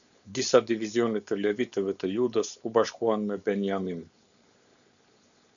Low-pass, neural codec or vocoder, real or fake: 7.2 kHz; codec, 16 kHz, 4.8 kbps, FACodec; fake